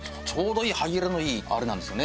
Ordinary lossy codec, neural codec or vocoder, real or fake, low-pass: none; none; real; none